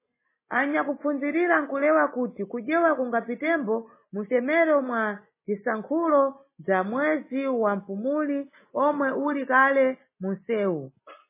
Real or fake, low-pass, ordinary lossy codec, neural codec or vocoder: real; 3.6 kHz; MP3, 16 kbps; none